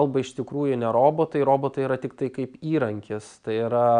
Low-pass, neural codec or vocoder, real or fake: 9.9 kHz; none; real